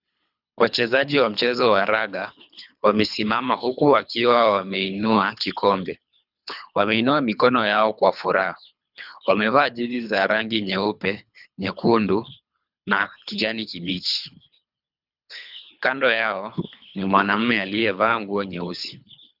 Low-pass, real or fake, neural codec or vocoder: 5.4 kHz; fake; codec, 24 kHz, 3 kbps, HILCodec